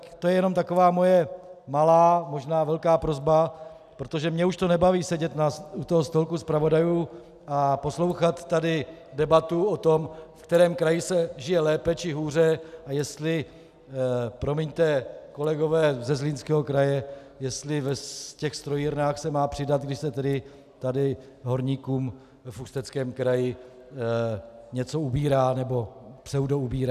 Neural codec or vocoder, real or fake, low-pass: none; real; 14.4 kHz